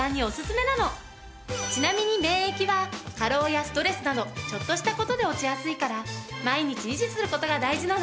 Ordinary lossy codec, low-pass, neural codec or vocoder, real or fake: none; none; none; real